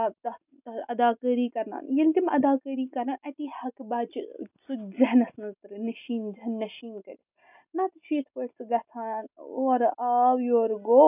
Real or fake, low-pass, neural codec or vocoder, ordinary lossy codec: real; 3.6 kHz; none; none